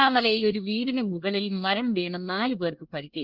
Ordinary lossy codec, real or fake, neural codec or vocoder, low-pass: Opus, 24 kbps; fake; codec, 24 kHz, 1 kbps, SNAC; 5.4 kHz